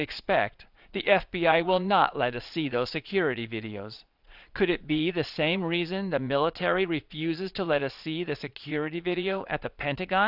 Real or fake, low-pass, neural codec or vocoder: fake; 5.4 kHz; vocoder, 22.05 kHz, 80 mel bands, Vocos